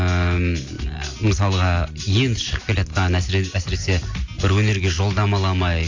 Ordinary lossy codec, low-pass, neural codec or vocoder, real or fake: AAC, 48 kbps; 7.2 kHz; none; real